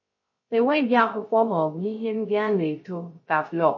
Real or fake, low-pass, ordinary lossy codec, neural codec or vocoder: fake; 7.2 kHz; MP3, 32 kbps; codec, 16 kHz, 0.3 kbps, FocalCodec